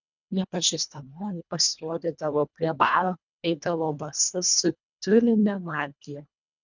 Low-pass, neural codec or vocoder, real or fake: 7.2 kHz; codec, 24 kHz, 1.5 kbps, HILCodec; fake